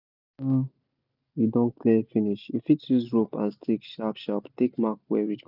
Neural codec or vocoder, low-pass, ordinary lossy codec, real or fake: none; 5.4 kHz; none; real